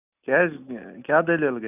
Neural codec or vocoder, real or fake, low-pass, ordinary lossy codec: none; real; 3.6 kHz; none